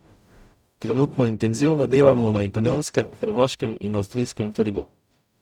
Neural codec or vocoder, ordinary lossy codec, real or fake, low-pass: codec, 44.1 kHz, 0.9 kbps, DAC; none; fake; 19.8 kHz